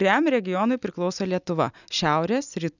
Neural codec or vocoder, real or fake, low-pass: none; real; 7.2 kHz